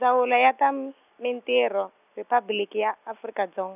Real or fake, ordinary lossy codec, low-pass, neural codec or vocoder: real; none; 3.6 kHz; none